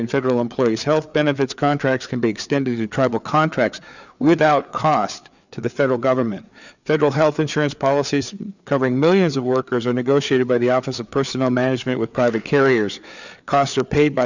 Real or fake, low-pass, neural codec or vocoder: fake; 7.2 kHz; codec, 16 kHz, 4 kbps, FreqCodec, larger model